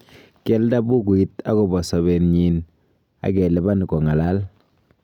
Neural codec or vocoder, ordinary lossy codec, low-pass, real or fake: none; none; 19.8 kHz; real